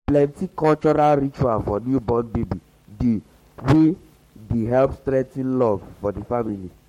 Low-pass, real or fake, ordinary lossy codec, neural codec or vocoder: 19.8 kHz; fake; MP3, 64 kbps; codec, 44.1 kHz, 7.8 kbps, Pupu-Codec